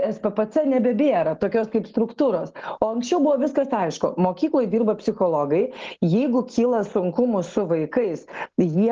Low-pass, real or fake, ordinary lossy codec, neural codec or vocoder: 7.2 kHz; real; Opus, 16 kbps; none